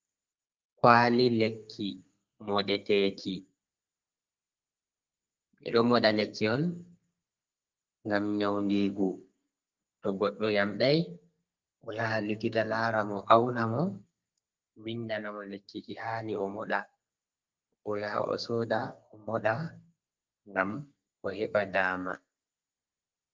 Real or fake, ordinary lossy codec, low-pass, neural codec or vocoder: fake; Opus, 24 kbps; 7.2 kHz; codec, 32 kHz, 1.9 kbps, SNAC